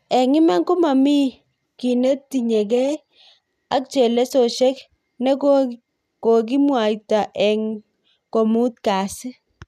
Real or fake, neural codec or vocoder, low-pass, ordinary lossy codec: real; none; 14.4 kHz; none